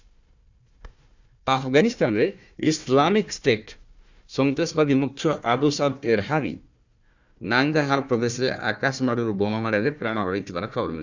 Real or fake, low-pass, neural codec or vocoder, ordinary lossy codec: fake; 7.2 kHz; codec, 16 kHz, 1 kbps, FunCodec, trained on Chinese and English, 50 frames a second; Opus, 64 kbps